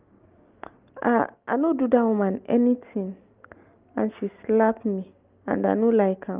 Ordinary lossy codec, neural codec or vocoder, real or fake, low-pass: Opus, 24 kbps; none; real; 3.6 kHz